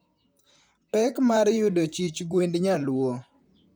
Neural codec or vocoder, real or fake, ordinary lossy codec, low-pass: vocoder, 44.1 kHz, 128 mel bands, Pupu-Vocoder; fake; none; none